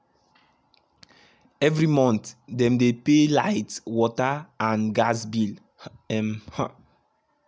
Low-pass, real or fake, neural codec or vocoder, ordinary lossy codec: none; real; none; none